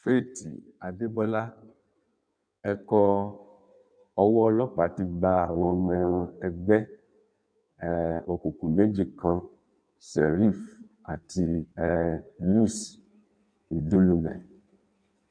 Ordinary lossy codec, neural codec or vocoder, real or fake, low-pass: none; codec, 16 kHz in and 24 kHz out, 1.1 kbps, FireRedTTS-2 codec; fake; 9.9 kHz